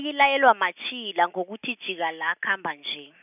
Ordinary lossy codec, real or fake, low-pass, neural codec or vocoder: none; real; 3.6 kHz; none